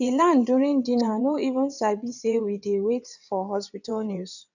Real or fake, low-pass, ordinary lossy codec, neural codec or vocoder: fake; 7.2 kHz; none; vocoder, 22.05 kHz, 80 mel bands, WaveNeXt